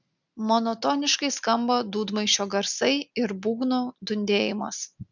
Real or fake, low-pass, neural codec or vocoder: real; 7.2 kHz; none